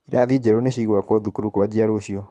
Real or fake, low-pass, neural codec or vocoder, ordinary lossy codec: fake; none; codec, 24 kHz, 6 kbps, HILCodec; none